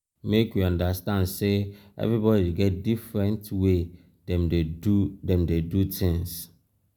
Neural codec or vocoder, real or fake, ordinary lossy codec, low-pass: none; real; none; none